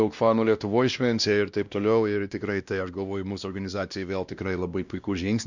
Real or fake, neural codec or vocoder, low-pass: fake; codec, 16 kHz, 1 kbps, X-Codec, WavLM features, trained on Multilingual LibriSpeech; 7.2 kHz